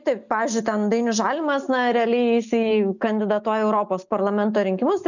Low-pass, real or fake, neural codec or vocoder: 7.2 kHz; real; none